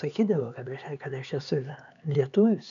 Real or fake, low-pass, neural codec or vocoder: fake; 7.2 kHz; codec, 16 kHz, 4 kbps, X-Codec, HuBERT features, trained on LibriSpeech